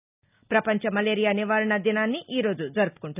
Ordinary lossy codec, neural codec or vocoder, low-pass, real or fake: none; none; 3.6 kHz; real